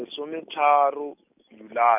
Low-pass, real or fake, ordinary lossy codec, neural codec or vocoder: 3.6 kHz; real; none; none